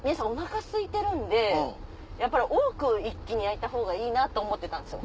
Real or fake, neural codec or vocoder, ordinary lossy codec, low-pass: real; none; none; none